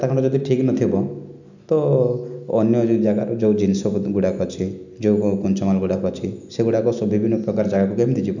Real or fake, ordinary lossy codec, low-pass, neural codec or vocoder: real; none; 7.2 kHz; none